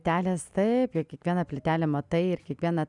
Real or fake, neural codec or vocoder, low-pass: real; none; 10.8 kHz